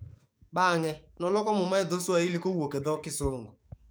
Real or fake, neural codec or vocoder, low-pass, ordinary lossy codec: fake; codec, 44.1 kHz, 7.8 kbps, DAC; none; none